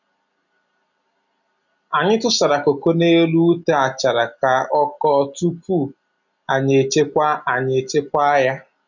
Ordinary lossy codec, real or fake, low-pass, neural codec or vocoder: none; real; 7.2 kHz; none